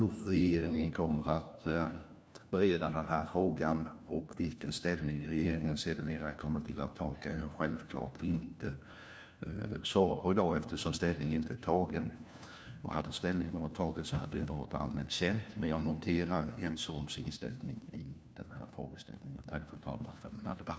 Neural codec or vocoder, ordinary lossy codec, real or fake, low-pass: codec, 16 kHz, 1 kbps, FunCodec, trained on LibriTTS, 50 frames a second; none; fake; none